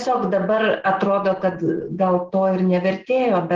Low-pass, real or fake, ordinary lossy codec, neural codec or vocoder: 7.2 kHz; real; Opus, 16 kbps; none